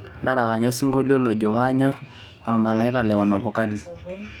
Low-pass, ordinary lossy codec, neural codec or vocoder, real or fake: 19.8 kHz; none; codec, 44.1 kHz, 2.6 kbps, DAC; fake